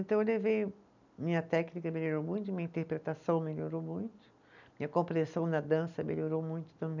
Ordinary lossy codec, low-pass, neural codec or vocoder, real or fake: none; 7.2 kHz; none; real